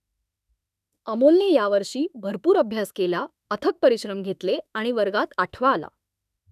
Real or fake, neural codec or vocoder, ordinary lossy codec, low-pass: fake; autoencoder, 48 kHz, 32 numbers a frame, DAC-VAE, trained on Japanese speech; none; 14.4 kHz